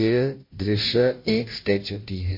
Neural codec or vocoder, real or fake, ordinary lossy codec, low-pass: codec, 16 kHz, 0.5 kbps, FunCodec, trained on Chinese and English, 25 frames a second; fake; MP3, 32 kbps; 5.4 kHz